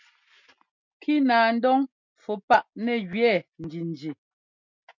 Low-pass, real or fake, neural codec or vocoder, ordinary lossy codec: 7.2 kHz; real; none; MP3, 64 kbps